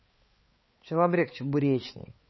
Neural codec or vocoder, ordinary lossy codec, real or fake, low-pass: codec, 16 kHz, 4 kbps, X-Codec, HuBERT features, trained on balanced general audio; MP3, 24 kbps; fake; 7.2 kHz